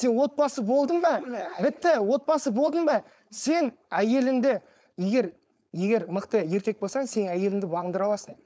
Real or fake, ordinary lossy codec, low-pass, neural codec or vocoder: fake; none; none; codec, 16 kHz, 4.8 kbps, FACodec